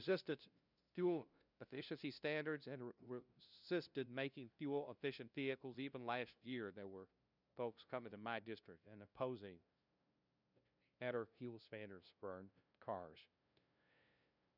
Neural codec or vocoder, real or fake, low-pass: codec, 16 kHz, 0.5 kbps, FunCodec, trained on LibriTTS, 25 frames a second; fake; 5.4 kHz